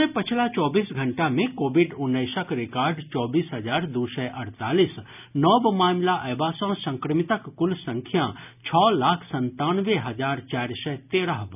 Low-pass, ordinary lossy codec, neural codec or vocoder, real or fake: 3.6 kHz; none; none; real